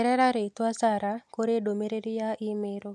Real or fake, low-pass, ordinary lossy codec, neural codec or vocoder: real; none; none; none